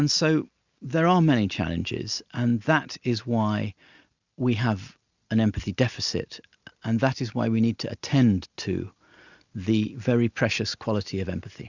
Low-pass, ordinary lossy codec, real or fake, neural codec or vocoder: 7.2 kHz; Opus, 64 kbps; real; none